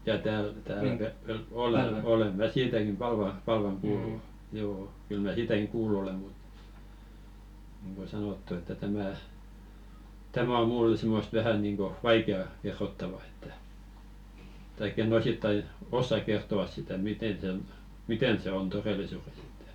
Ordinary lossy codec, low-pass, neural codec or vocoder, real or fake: none; 19.8 kHz; none; real